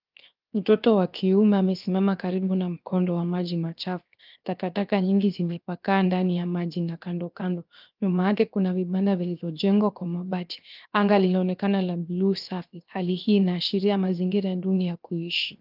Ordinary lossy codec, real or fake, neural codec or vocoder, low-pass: Opus, 24 kbps; fake; codec, 16 kHz, 0.7 kbps, FocalCodec; 5.4 kHz